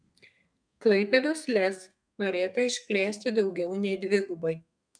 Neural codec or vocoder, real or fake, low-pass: codec, 32 kHz, 1.9 kbps, SNAC; fake; 9.9 kHz